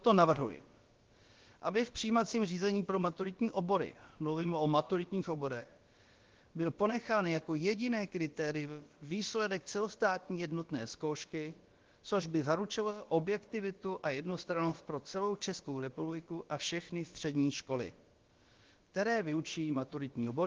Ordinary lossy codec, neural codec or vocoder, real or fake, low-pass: Opus, 16 kbps; codec, 16 kHz, about 1 kbps, DyCAST, with the encoder's durations; fake; 7.2 kHz